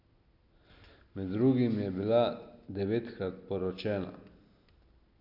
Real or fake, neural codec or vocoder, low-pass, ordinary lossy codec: real; none; 5.4 kHz; none